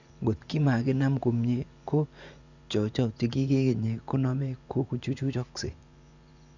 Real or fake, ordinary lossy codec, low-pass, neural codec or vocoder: fake; none; 7.2 kHz; vocoder, 24 kHz, 100 mel bands, Vocos